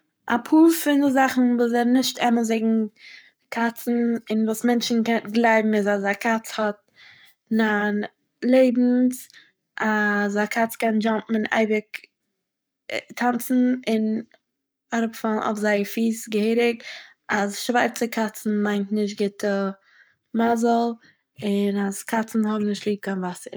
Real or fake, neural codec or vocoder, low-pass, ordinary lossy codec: fake; codec, 44.1 kHz, 7.8 kbps, Pupu-Codec; none; none